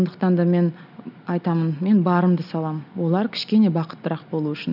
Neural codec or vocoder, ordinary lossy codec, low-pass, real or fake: none; none; 5.4 kHz; real